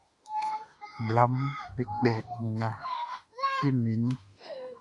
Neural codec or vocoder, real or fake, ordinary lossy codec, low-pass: autoencoder, 48 kHz, 32 numbers a frame, DAC-VAE, trained on Japanese speech; fake; Opus, 64 kbps; 10.8 kHz